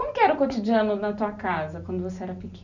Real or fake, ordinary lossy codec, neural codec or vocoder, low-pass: real; none; none; 7.2 kHz